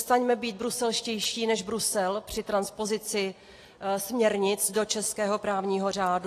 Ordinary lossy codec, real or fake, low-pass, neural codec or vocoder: AAC, 48 kbps; real; 14.4 kHz; none